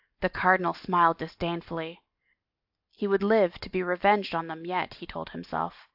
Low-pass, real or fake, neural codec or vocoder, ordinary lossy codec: 5.4 kHz; real; none; AAC, 48 kbps